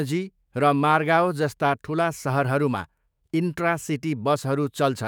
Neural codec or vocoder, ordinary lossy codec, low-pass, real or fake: autoencoder, 48 kHz, 128 numbers a frame, DAC-VAE, trained on Japanese speech; none; none; fake